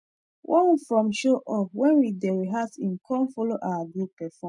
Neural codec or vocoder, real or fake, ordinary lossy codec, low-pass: none; real; none; 10.8 kHz